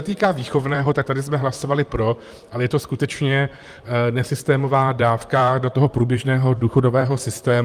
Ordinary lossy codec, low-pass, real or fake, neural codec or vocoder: Opus, 32 kbps; 14.4 kHz; fake; vocoder, 44.1 kHz, 128 mel bands, Pupu-Vocoder